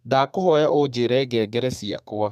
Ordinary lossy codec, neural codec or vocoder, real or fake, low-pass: none; codec, 32 kHz, 1.9 kbps, SNAC; fake; 14.4 kHz